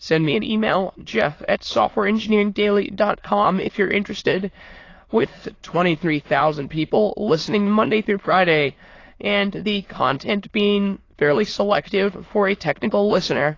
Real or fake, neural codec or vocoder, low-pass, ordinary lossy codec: fake; autoencoder, 22.05 kHz, a latent of 192 numbers a frame, VITS, trained on many speakers; 7.2 kHz; AAC, 32 kbps